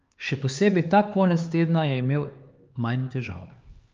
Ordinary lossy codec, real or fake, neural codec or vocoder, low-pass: Opus, 24 kbps; fake; codec, 16 kHz, 4 kbps, X-Codec, HuBERT features, trained on LibriSpeech; 7.2 kHz